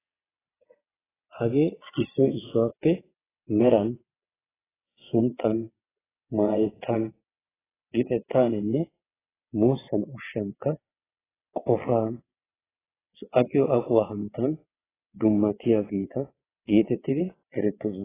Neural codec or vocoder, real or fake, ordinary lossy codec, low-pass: vocoder, 22.05 kHz, 80 mel bands, WaveNeXt; fake; AAC, 16 kbps; 3.6 kHz